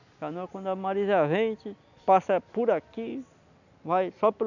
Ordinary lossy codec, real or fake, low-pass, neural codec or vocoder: none; real; 7.2 kHz; none